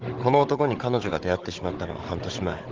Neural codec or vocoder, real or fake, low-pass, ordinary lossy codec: codec, 16 kHz, 16 kbps, FunCodec, trained on Chinese and English, 50 frames a second; fake; 7.2 kHz; Opus, 16 kbps